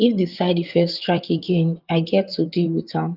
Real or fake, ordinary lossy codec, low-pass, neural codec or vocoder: fake; Opus, 24 kbps; 5.4 kHz; vocoder, 44.1 kHz, 128 mel bands, Pupu-Vocoder